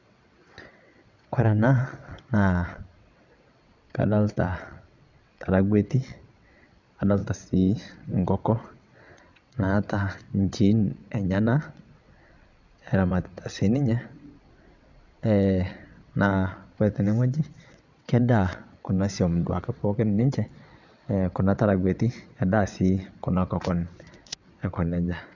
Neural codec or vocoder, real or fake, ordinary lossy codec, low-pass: vocoder, 22.05 kHz, 80 mel bands, WaveNeXt; fake; none; 7.2 kHz